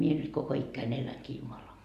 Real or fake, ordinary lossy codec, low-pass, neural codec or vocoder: real; Opus, 64 kbps; 14.4 kHz; none